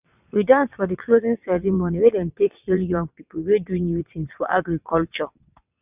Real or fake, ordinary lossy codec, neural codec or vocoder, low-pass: fake; none; vocoder, 22.05 kHz, 80 mel bands, WaveNeXt; 3.6 kHz